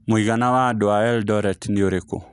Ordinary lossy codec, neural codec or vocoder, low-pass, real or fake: none; none; 10.8 kHz; real